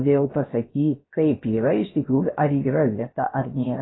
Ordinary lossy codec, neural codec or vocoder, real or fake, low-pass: AAC, 16 kbps; codec, 16 kHz, 0.7 kbps, FocalCodec; fake; 7.2 kHz